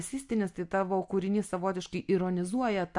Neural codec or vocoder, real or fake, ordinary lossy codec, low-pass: none; real; MP3, 64 kbps; 10.8 kHz